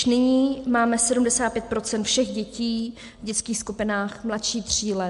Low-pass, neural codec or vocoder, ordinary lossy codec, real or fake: 10.8 kHz; none; MP3, 64 kbps; real